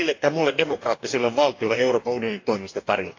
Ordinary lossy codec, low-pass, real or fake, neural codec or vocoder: AAC, 48 kbps; 7.2 kHz; fake; codec, 44.1 kHz, 2.6 kbps, DAC